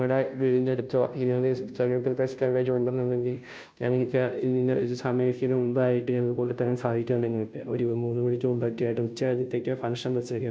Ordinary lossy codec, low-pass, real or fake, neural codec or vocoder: none; none; fake; codec, 16 kHz, 0.5 kbps, FunCodec, trained on Chinese and English, 25 frames a second